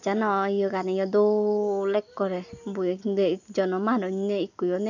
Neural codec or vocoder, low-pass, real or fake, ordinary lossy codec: none; 7.2 kHz; real; none